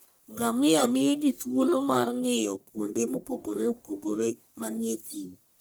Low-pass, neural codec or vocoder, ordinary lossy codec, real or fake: none; codec, 44.1 kHz, 1.7 kbps, Pupu-Codec; none; fake